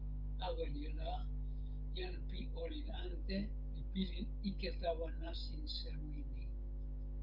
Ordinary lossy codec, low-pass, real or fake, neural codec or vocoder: Opus, 24 kbps; 5.4 kHz; fake; codec, 16 kHz, 16 kbps, FreqCodec, smaller model